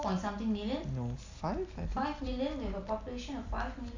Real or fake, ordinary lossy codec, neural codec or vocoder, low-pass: real; AAC, 48 kbps; none; 7.2 kHz